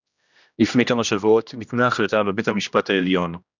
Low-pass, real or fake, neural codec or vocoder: 7.2 kHz; fake; codec, 16 kHz, 1 kbps, X-Codec, HuBERT features, trained on general audio